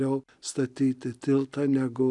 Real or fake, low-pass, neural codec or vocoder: real; 10.8 kHz; none